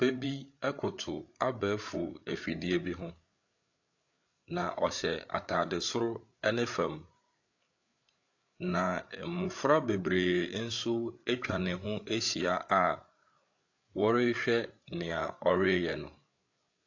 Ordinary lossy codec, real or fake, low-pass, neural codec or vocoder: AAC, 48 kbps; fake; 7.2 kHz; codec, 16 kHz, 8 kbps, FreqCodec, larger model